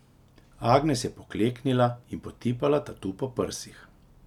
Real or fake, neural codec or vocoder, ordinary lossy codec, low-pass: real; none; none; 19.8 kHz